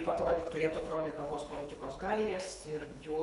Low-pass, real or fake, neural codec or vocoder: 10.8 kHz; fake; codec, 24 kHz, 3 kbps, HILCodec